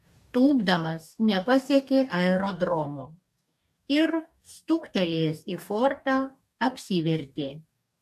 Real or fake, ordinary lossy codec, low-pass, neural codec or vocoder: fake; AAC, 96 kbps; 14.4 kHz; codec, 44.1 kHz, 2.6 kbps, DAC